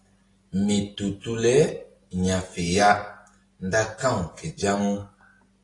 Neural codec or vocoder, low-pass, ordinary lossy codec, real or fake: none; 10.8 kHz; AAC, 32 kbps; real